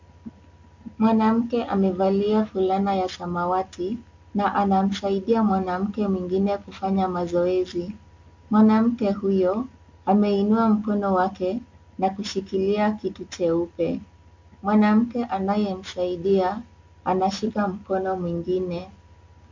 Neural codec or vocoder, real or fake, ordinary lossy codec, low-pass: none; real; MP3, 48 kbps; 7.2 kHz